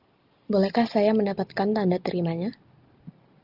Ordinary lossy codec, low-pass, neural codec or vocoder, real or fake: Opus, 32 kbps; 5.4 kHz; none; real